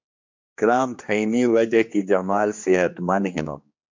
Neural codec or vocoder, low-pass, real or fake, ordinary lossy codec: codec, 16 kHz, 2 kbps, X-Codec, HuBERT features, trained on general audio; 7.2 kHz; fake; MP3, 48 kbps